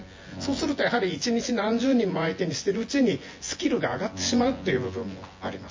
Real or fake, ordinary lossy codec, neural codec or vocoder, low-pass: fake; MP3, 32 kbps; vocoder, 24 kHz, 100 mel bands, Vocos; 7.2 kHz